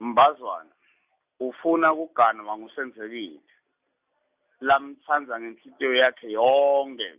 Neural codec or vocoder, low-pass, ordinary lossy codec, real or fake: none; 3.6 kHz; Opus, 64 kbps; real